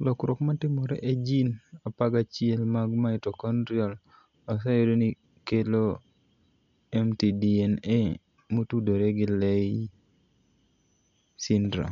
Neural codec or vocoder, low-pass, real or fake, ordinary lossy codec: none; 7.2 kHz; real; none